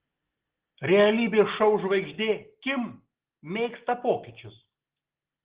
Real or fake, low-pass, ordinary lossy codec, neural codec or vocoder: real; 3.6 kHz; Opus, 16 kbps; none